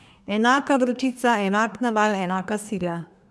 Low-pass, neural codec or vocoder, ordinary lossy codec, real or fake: none; codec, 24 kHz, 1 kbps, SNAC; none; fake